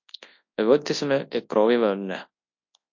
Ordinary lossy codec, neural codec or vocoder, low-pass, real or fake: MP3, 48 kbps; codec, 24 kHz, 0.9 kbps, WavTokenizer, large speech release; 7.2 kHz; fake